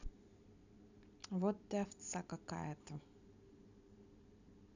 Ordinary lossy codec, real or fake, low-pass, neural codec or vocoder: none; real; 7.2 kHz; none